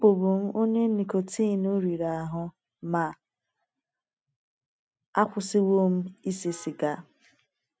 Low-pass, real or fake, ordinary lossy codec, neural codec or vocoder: none; real; none; none